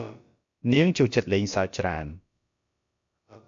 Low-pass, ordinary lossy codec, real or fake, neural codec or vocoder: 7.2 kHz; MP3, 64 kbps; fake; codec, 16 kHz, about 1 kbps, DyCAST, with the encoder's durations